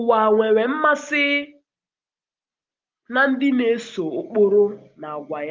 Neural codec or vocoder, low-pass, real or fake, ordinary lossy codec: none; 7.2 kHz; real; Opus, 32 kbps